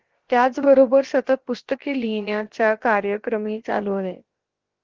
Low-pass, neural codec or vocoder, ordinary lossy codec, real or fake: 7.2 kHz; codec, 16 kHz, about 1 kbps, DyCAST, with the encoder's durations; Opus, 16 kbps; fake